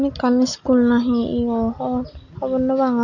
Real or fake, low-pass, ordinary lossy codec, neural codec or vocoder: real; 7.2 kHz; none; none